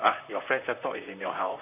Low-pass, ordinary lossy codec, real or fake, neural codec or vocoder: 3.6 kHz; none; fake; vocoder, 44.1 kHz, 128 mel bands, Pupu-Vocoder